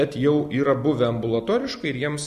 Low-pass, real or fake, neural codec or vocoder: 14.4 kHz; real; none